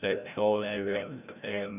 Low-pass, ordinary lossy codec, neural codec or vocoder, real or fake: 3.6 kHz; none; codec, 16 kHz, 0.5 kbps, FreqCodec, larger model; fake